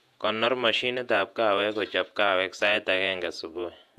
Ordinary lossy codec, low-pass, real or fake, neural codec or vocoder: AAC, 96 kbps; 14.4 kHz; fake; vocoder, 48 kHz, 128 mel bands, Vocos